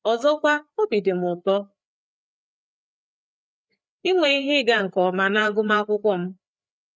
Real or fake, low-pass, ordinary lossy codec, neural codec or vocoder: fake; none; none; codec, 16 kHz, 4 kbps, FreqCodec, larger model